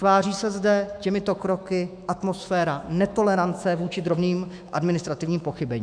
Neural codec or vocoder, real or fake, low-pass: autoencoder, 48 kHz, 128 numbers a frame, DAC-VAE, trained on Japanese speech; fake; 9.9 kHz